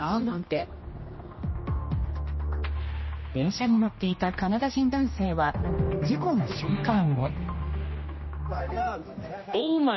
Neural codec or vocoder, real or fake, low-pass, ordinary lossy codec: codec, 16 kHz, 1 kbps, X-Codec, HuBERT features, trained on general audio; fake; 7.2 kHz; MP3, 24 kbps